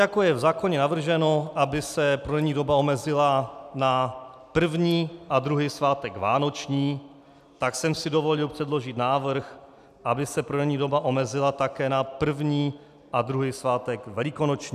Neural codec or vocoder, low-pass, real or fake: none; 14.4 kHz; real